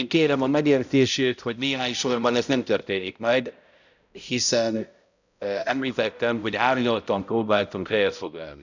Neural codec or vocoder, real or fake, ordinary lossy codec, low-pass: codec, 16 kHz, 0.5 kbps, X-Codec, HuBERT features, trained on balanced general audio; fake; none; 7.2 kHz